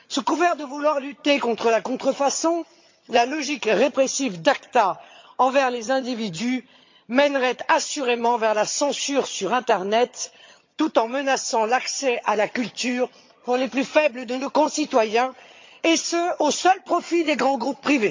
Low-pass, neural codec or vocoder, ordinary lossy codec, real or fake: 7.2 kHz; vocoder, 22.05 kHz, 80 mel bands, HiFi-GAN; MP3, 48 kbps; fake